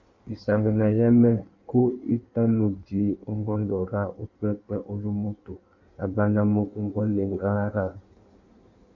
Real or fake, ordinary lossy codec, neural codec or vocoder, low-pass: fake; MP3, 64 kbps; codec, 16 kHz in and 24 kHz out, 1.1 kbps, FireRedTTS-2 codec; 7.2 kHz